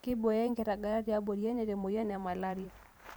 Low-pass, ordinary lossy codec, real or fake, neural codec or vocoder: none; none; fake; vocoder, 44.1 kHz, 128 mel bands every 512 samples, BigVGAN v2